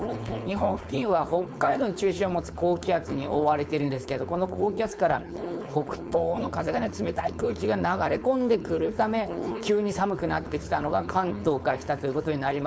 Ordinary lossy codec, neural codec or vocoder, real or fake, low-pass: none; codec, 16 kHz, 4.8 kbps, FACodec; fake; none